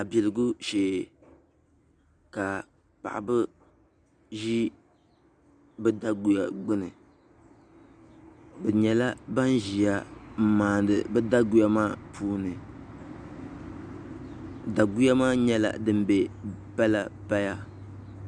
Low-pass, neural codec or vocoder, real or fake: 9.9 kHz; none; real